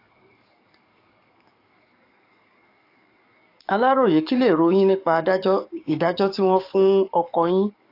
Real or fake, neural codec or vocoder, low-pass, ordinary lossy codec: fake; codec, 44.1 kHz, 7.8 kbps, DAC; 5.4 kHz; AAC, 32 kbps